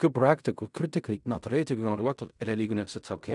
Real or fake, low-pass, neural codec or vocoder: fake; 10.8 kHz; codec, 16 kHz in and 24 kHz out, 0.4 kbps, LongCat-Audio-Codec, fine tuned four codebook decoder